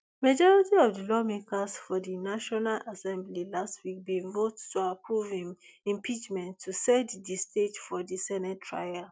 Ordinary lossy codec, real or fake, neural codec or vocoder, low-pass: none; real; none; none